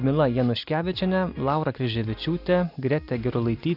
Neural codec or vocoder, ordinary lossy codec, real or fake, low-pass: none; Opus, 64 kbps; real; 5.4 kHz